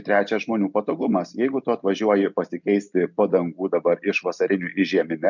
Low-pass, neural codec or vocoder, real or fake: 7.2 kHz; none; real